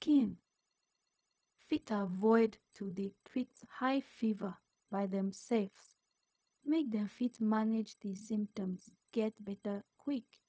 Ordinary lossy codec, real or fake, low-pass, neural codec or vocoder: none; fake; none; codec, 16 kHz, 0.4 kbps, LongCat-Audio-Codec